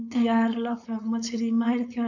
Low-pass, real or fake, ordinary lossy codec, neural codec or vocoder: 7.2 kHz; fake; none; codec, 16 kHz, 4.8 kbps, FACodec